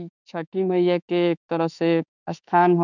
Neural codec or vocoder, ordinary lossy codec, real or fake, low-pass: autoencoder, 48 kHz, 32 numbers a frame, DAC-VAE, trained on Japanese speech; none; fake; 7.2 kHz